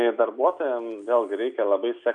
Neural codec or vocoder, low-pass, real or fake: none; 9.9 kHz; real